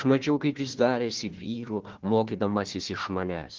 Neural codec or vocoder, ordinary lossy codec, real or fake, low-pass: codec, 16 kHz, 1 kbps, FunCodec, trained on Chinese and English, 50 frames a second; Opus, 16 kbps; fake; 7.2 kHz